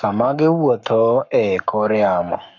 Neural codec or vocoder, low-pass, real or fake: codec, 44.1 kHz, 7.8 kbps, Pupu-Codec; 7.2 kHz; fake